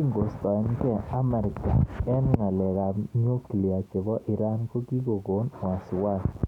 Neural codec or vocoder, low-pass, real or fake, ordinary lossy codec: none; 19.8 kHz; real; none